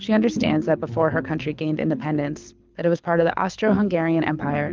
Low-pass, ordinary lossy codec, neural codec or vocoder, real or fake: 7.2 kHz; Opus, 32 kbps; codec, 24 kHz, 6 kbps, HILCodec; fake